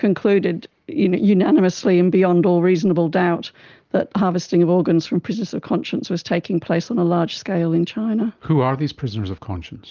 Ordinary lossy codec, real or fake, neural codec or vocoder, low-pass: Opus, 32 kbps; real; none; 7.2 kHz